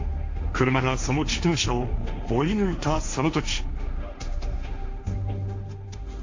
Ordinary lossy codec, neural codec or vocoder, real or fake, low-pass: AAC, 48 kbps; codec, 16 kHz, 1.1 kbps, Voila-Tokenizer; fake; 7.2 kHz